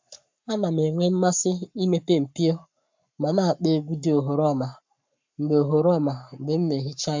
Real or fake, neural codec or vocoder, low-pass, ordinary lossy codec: fake; codec, 44.1 kHz, 7.8 kbps, Pupu-Codec; 7.2 kHz; MP3, 64 kbps